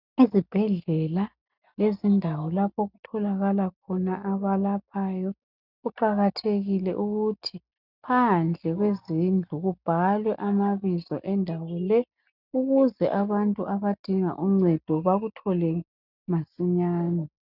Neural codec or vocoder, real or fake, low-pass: none; real; 5.4 kHz